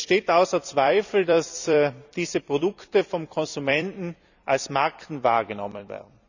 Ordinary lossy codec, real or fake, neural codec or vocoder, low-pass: none; fake; vocoder, 44.1 kHz, 128 mel bands every 256 samples, BigVGAN v2; 7.2 kHz